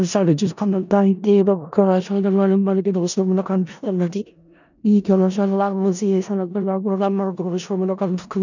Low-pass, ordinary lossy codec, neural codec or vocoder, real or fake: 7.2 kHz; none; codec, 16 kHz in and 24 kHz out, 0.4 kbps, LongCat-Audio-Codec, four codebook decoder; fake